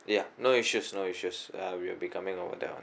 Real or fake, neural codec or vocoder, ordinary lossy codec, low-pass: real; none; none; none